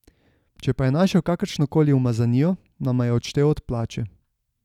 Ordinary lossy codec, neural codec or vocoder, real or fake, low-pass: none; none; real; 19.8 kHz